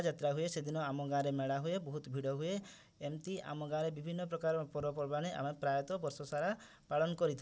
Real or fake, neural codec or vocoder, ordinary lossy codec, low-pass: real; none; none; none